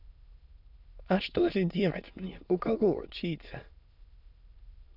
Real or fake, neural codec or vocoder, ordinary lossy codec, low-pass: fake; autoencoder, 22.05 kHz, a latent of 192 numbers a frame, VITS, trained on many speakers; none; 5.4 kHz